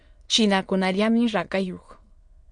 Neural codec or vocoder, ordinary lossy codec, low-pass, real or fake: autoencoder, 22.05 kHz, a latent of 192 numbers a frame, VITS, trained on many speakers; MP3, 48 kbps; 9.9 kHz; fake